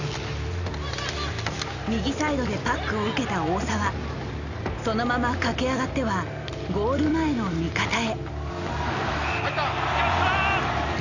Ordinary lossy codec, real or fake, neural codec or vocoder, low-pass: none; real; none; 7.2 kHz